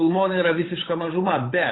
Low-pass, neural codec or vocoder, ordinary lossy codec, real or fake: 7.2 kHz; codec, 16 kHz, 8 kbps, FunCodec, trained on Chinese and English, 25 frames a second; AAC, 16 kbps; fake